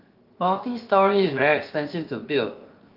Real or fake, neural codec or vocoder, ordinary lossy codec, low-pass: fake; codec, 16 kHz, 0.7 kbps, FocalCodec; Opus, 32 kbps; 5.4 kHz